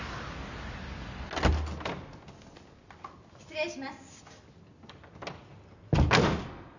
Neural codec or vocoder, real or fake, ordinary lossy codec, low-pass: none; real; none; 7.2 kHz